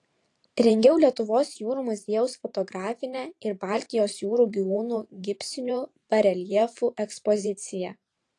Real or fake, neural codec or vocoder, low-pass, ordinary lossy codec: fake; vocoder, 44.1 kHz, 128 mel bands every 512 samples, BigVGAN v2; 10.8 kHz; AAC, 48 kbps